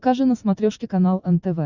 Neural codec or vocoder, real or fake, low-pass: none; real; 7.2 kHz